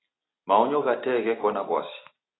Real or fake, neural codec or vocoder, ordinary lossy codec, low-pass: real; none; AAC, 16 kbps; 7.2 kHz